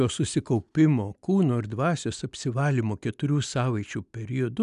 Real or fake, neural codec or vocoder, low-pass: real; none; 10.8 kHz